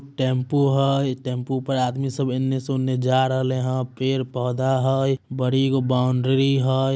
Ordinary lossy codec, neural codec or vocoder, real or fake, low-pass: none; none; real; none